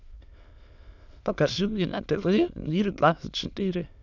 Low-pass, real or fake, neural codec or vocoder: 7.2 kHz; fake; autoencoder, 22.05 kHz, a latent of 192 numbers a frame, VITS, trained on many speakers